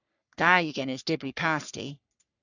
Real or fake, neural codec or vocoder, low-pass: fake; codec, 44.1 kHz, 3.4 kbps, Pupu-Codec; 7.2 kHz